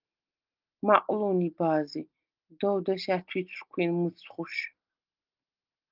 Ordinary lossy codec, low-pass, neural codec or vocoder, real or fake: Opus, 24 kbps; 5.4 kHz; none; real